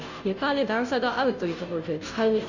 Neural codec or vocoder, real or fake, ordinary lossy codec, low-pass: codec, 16 kHz, 0.5 kbps, FunCodec, trained on Chinese and English, 25 frames a second; fake; none; 7.2 kHz